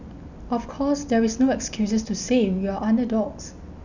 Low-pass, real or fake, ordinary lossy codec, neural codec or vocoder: 7.2 kHz; real; none; none